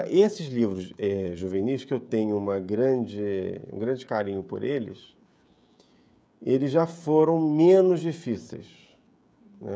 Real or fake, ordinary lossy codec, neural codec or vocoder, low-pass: fake; none; codec, 16 kHz, 16 kbps, FreqCodec, smaller model; none